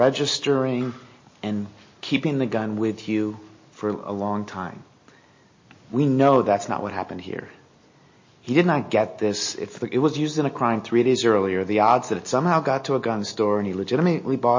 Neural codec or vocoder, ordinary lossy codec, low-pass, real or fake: none; MP3, 32 kbps; 7.2 kHz; real